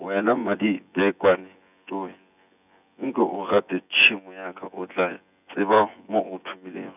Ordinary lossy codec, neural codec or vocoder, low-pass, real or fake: none; vocoder, 24 kHz, 100 mel bands, Vocos; 3.6 kHz; fake